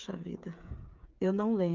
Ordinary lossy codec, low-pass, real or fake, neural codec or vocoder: Opus, 32 kbps; 7.2 kHz; fake; codec, 16 kHz, 16 kbps, FreqCodec, smaller model